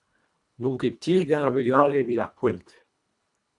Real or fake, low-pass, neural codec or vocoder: fake; 10.8 kHz; codec, 24 kHz, 1.5 kbps, HILCodec